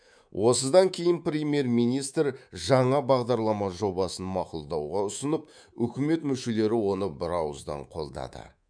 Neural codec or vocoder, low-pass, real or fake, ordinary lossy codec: codec, 24 kHz, 3.1 kbps, DualCodec; 9.9 kHz; fake; none